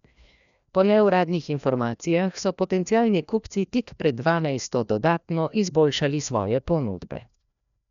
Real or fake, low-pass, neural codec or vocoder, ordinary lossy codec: fake; 7.2 kHz; codec, 16 kHz, 1 kbps, FreqCodec, larger model; none